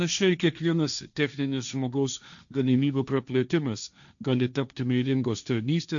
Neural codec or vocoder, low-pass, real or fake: codec, 16 kHz, 1.1 kbps, Voila-Tokenizer; 7.2 kHz; fake